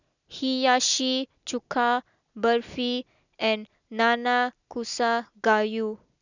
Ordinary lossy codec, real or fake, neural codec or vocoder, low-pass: none; real; none; 7.2 kHz